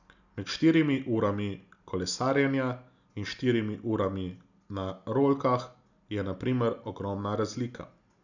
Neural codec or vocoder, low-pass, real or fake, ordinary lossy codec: none; 7.2 kHz; real; none